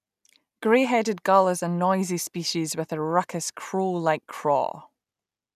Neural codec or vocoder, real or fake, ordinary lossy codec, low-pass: none; real; none; 14.4 kHz